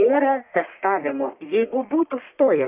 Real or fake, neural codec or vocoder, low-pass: fake; codec, 44.1 kHz, 1.7 kbps, Pupu-Codec; 3.6 kHz